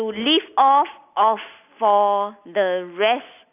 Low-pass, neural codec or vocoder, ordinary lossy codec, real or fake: 3.6 kHz; none; none; real